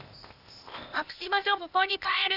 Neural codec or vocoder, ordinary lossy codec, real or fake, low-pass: codec, 16 kHz, 0.8 kbps, ZipCodec; none; fake; 5.4 kHz